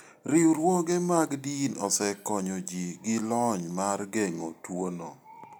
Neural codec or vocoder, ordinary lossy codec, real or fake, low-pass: none; none; real; none